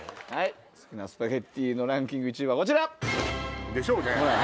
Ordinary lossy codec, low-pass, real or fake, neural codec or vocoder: none; none; real; none